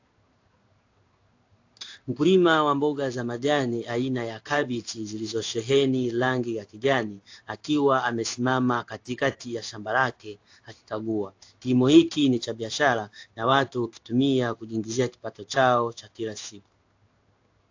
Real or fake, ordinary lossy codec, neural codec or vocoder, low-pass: fake; AAC, 48 kbps; codec, 16 kHz in and 24 kHz out, 1 kbps, XY-Tokenizer; 7.2 kHz